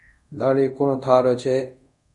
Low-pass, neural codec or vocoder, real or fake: 10.8 kHz; codec, 24 kHz, 0.5 kbps, DualCodec; fake